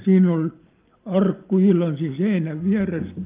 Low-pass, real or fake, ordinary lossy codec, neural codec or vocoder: 3.6 kHz; real; Opus, 32 kbps; none